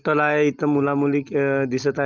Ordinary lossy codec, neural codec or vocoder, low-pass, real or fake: Opus, 32 kbps; none; 7.2 kHz; real